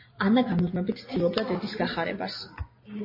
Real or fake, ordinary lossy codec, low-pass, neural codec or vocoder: real; MP3, 24 kbps; 5.4 kHz; none